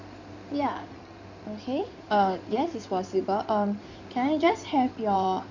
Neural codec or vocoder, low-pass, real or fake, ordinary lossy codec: codec, 16 kHz in and 24 kHz out, 2.2 kbps, FireRedTTS-2 codec; 7.2 kHz; fake; none